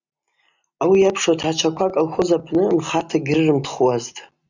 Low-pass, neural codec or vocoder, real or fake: 7.2 kHz; none; real